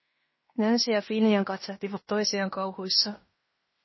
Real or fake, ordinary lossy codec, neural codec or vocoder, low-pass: fake; MP3, 24 kbps; codec, 16 kHz in and 24 kHz out, 0.9 kbps, LongCat-Audio-Codec, fine tuned four codebook decoder; 7.2 kHz